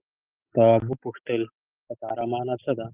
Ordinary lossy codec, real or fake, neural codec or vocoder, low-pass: Opus, 32 kbps; real; none; 3.6 kHz